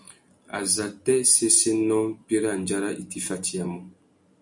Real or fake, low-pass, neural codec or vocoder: real; 10.8 kHz; none